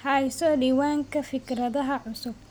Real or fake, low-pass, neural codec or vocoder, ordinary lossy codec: real; none; none; none